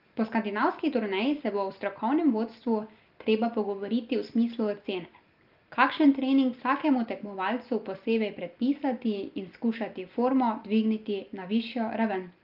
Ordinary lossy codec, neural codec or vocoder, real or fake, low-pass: Opus, 32 kbps; none; real; 5.4 kHz